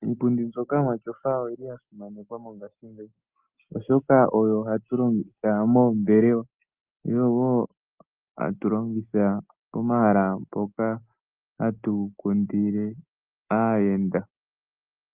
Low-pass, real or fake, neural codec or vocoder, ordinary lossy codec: 3.6 kHz; real; none; Opus, 24 kbps